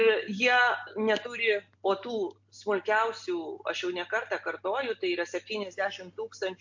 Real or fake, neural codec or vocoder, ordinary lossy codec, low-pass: real; none; MP3, 48 kbps; 7.2 kHz